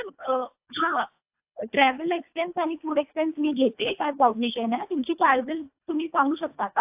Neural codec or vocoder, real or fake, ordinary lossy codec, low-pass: codec, 24 kHz, 1.5 kbps, HILCodec; fake; AAC, 32 kbps; 3.6 kHz